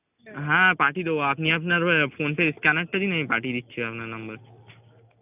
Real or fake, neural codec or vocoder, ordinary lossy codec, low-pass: real; none; none; 3.6 kHz